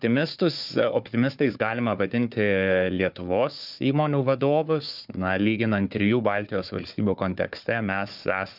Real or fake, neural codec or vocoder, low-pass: fake; codec, 16 kHz, 4 kbps, FunCodec, trained on Chinese and English, 50 frames a second; 5.4 kHz